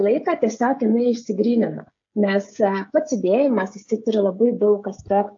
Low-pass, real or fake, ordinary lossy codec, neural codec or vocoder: 7.2 kHz; fake; AAC, 48 kbps; codec, 16 kHz, 16 kbps, FreqCodec, smaller model